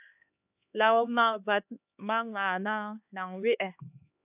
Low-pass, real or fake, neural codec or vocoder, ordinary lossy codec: 3.6 kHz; fake; codec, 16 kHz, 2 kbps, X-Codec, HuBERT features, trained on LibriSpeech; AAC, 32 kbps